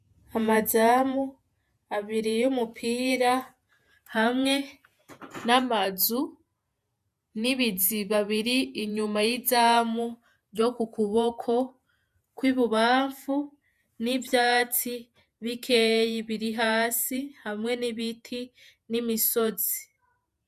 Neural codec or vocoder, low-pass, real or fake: vocoder, 48 kHz, 128 mel bands, Vocos; 14.4 kHz; fake